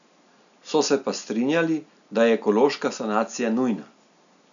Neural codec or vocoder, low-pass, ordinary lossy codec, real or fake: none; 7.2 kHz; none; real